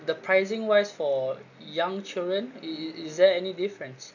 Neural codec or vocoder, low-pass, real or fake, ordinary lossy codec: none; 7.2 kHz; real; none